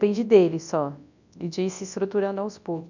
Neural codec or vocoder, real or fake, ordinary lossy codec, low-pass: codec, 24 kHz, 0.9 kbps, WavTokenizer, large speech release; fake; none; 7.2 kHz